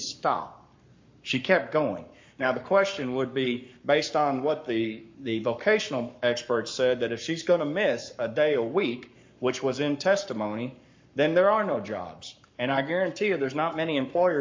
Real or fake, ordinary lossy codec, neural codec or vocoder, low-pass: fake; MP3, 48 kbps; codec, 44.1 kHz, 7.8 kbps, Pupu-Codec; 7.2 kHz